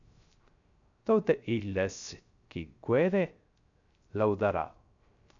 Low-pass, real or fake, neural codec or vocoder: 7.2 kHz; fake; codec, 16 kHz, 0.3 kbps, FocalCodec